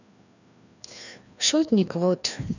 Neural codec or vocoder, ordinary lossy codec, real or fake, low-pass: codec, 16 kHz, 1 kbps, FreqCodec, larger model; none; fake; 7.2 kHz